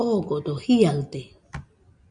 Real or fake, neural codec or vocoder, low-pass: real; none; 9.9 kHz